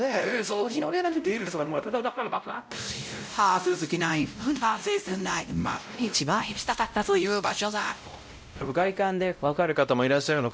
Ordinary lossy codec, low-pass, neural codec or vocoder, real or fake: none; none; codec, 16 kHz, 0.5 kbps, X-Codec, WavLM features, trained on Multilingual LibriSpeech; fake